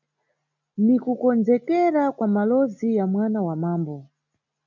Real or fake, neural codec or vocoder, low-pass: real; none; 7.2 kHz